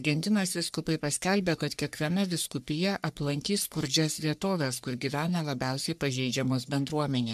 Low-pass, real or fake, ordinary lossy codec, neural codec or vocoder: 14.4 kHz; fake; MP3, 96 kbps; codec, 44.1 kHz, 3.4 kbps, Pupu-Codec